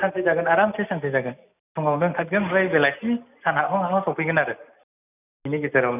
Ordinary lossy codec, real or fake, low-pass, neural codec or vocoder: none; real; 3.6 kHz; none